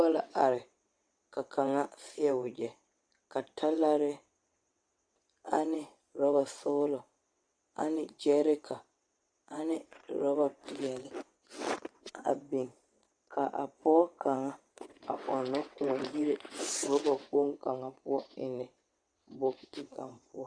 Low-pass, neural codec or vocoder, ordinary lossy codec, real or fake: 9.9 kHz; vocoder, 22.05 kHz, 80 mel bands, WaveNeXt; Opus, 64 kbps; fake